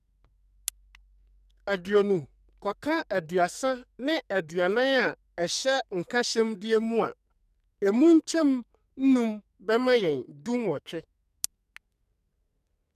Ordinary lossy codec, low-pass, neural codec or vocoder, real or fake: none; 14.4 kHz; codec, 44.1 kHz, 2.6 kbps, SNAC; fake